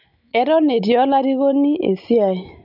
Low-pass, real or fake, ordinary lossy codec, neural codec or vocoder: 5.4 kHz; real; none; none